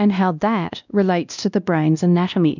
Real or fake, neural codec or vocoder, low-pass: fake; codec, 16 kHz, 1 kbps, X-Codec, WavLM features, trained on Multilingual LibriSpeech; 7.2 kHz